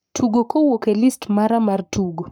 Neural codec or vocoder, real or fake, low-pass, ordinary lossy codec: codec, 44.1 kHz, 7.8 kbps, Pupu-Codec; fake; none; none